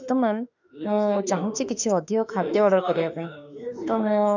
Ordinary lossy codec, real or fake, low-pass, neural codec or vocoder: none; fake; 7.2 kHz; autoencoder, 48 kHz, 32 numbers a frame, DAC-VAE, trained on Japanese speech